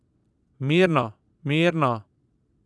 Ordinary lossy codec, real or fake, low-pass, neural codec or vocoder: none; fake; none; vocoder, 22.05 kHz, 80 mel bands, Vocos